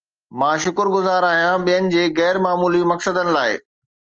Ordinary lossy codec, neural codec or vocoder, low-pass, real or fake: Opus, 24 kbps; none; 7.2 kHz; real